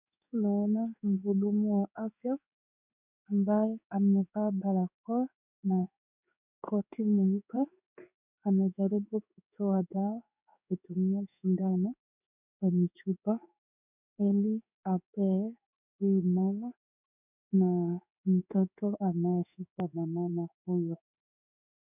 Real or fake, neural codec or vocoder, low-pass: fake; codec, 16 kHz in and 24 kHz out, 1 kbps, XY-Tokenizer; 3.6 kHz